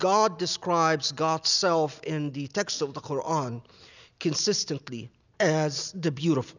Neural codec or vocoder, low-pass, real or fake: none; 7.2 kHz; real